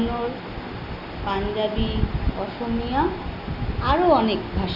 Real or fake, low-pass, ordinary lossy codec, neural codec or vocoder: real; 5.4 kHz; none; none